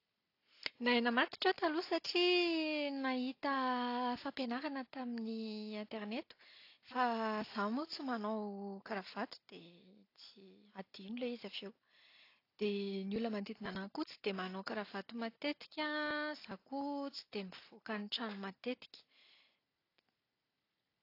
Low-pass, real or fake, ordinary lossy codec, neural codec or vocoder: 5.4 kHz; real; AAC, 32 kbps; none